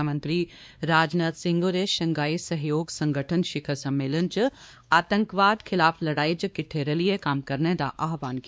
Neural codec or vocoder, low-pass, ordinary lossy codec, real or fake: codec, 16 kHz, 2 kbps, X-Codec, WavLM features, trained on Multilingual LibriSpeech; none; none; fake